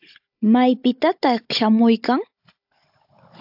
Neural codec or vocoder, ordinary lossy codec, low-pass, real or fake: codec, 16 kHz, 16 kbps, FunCodec, trained on Chinese and English, 50 frames a second; AAC, 48 kbps; 5.4 kHz; fake